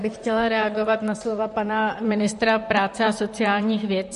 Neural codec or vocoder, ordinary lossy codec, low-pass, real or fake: vocoder, 44.1 kHz, 128 mel bands, Pupu-Vocoder; MP3, 48 kbps; 14.4 kHz; fake